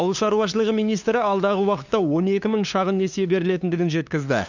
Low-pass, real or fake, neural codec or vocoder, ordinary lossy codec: 7.2 kHz; fake; codec, 16 kHz, 2 kbps, FunCodec, trained on LibriTTS, 25 frames a second; none